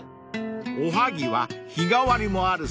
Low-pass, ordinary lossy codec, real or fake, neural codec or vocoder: none; none; real; none